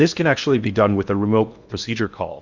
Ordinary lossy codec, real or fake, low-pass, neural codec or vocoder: Opus, 64 kbps; fake; 7.2 kHz; codec, 16 kHz in and 24 kHz out, 0.8 kbps, FocalCodec, streaming, 65536 codes